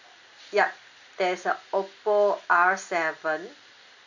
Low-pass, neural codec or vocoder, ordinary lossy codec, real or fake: 7.2 kHz; none; none; real